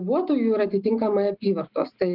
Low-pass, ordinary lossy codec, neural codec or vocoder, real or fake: 5.4 kHz; Opus, 32 kbps; none; real